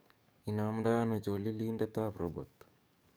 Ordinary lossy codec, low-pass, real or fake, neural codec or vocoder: none; none; fake; codec, 44.1 kHz, 7.8 kbps, DAC